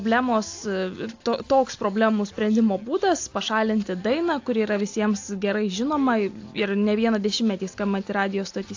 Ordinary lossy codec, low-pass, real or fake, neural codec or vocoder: AAC, 48 kbps; 7.2 kHz; real; none